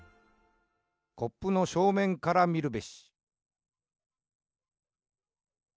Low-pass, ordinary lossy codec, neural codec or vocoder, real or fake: none; none; none; real